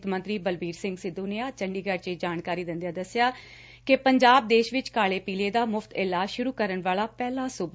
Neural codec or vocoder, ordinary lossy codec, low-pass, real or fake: none; none; none; real